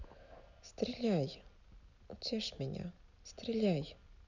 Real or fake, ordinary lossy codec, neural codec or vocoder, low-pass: real; none; none; 7.2 kHz